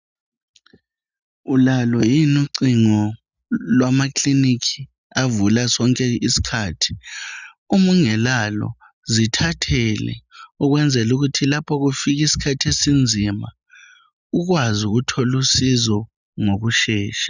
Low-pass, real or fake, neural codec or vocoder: 7.2 kHz; real; none